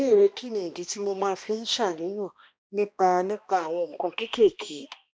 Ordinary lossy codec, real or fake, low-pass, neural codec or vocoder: none; fake; none; codec, 16 kHz, 1 kbps, X-Codec, HuBERT features, trained on balanced general audio